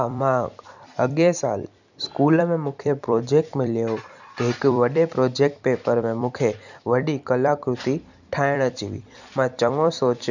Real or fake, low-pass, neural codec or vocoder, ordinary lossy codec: fake; 7.2 kHz; vocoder, 44.1 kHz, 128 mel bands every 256 samples, BigVGAN v2; none